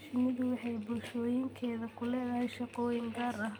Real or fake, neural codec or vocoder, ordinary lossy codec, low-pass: real; none; none; none